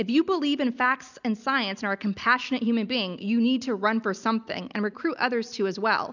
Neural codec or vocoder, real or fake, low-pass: none; real; 7.2 kHz